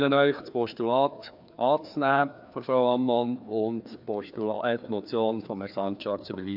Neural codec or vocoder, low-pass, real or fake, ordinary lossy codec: codec, 16 kHz, 2 kbps, FreqCodec, larger model; 5.4 kHz; fake; AAC, 48 kbps